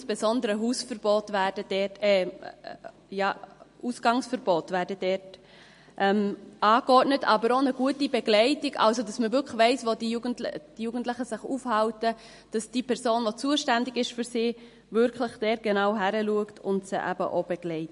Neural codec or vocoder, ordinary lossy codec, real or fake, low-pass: none; MP3, 48 kbps; real; 10.8 kHz